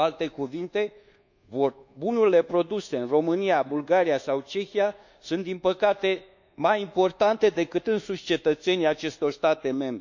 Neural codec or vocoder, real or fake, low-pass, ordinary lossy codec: codec, 24 kHz, 1.2 kbps, DualCodec; fake; 7.2 kHz; none